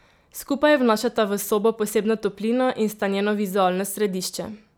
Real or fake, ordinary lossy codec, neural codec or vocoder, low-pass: real; none; none; none